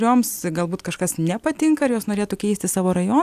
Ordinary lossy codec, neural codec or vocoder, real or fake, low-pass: AAC, 96 kbps; none; real; 14.4 kHz